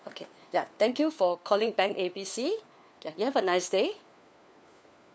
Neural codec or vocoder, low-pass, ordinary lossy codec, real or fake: codec, 16 kHz, 4 kbps, FunCodec, trained on LibriTTS, 50 frames a second; none; none; fake